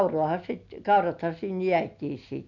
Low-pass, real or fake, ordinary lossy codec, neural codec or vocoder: 7.2 kHz; real; none; none